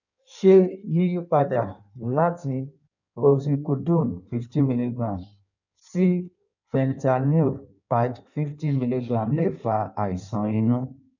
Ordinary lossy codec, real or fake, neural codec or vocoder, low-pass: none; fake; codec, 16 kHz in and 24 kHz out, 1.1 kbps, FireRedTTS-2 codec; 7.2 kHz